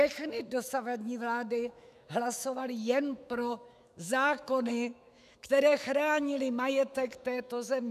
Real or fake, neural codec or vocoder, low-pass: fake; autoencoder, 48 kHz, 128 numbers a frame, DAC-VAE, trained on Japanese speech; 14.4 kHz